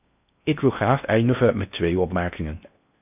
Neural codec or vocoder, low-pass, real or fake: codec, 16 kHz in and 24 kHz out, 0.6 kbps, FocalCodec, streaming, 4096 codes; 3.6 kHz; fake